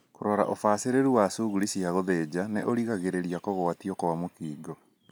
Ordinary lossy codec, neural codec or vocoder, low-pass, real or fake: none; none; none; real